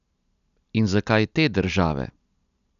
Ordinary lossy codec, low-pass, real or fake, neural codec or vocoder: none; 7.2 kHz; real; none